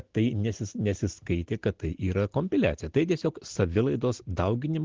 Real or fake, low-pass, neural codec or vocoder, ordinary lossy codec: real; 7.2 kHz; none; Opus, 16 kbps